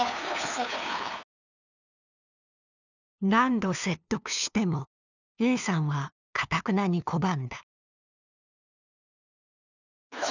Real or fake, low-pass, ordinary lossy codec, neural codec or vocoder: fake; 7.2 kHz; none; codec, 16 kHz, 2 kbps, FunCodec, trained on LibriTTS, 25 frames a second